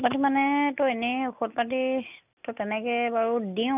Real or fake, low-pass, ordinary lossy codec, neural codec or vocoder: real; 3.6 kHz; none; none